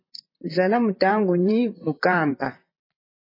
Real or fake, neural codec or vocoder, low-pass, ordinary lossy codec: fake; vocoder, 44.1 kHz, 128 mel bands, Pupu-Vocoder; 5.4 kHz; MP3, 24 kbps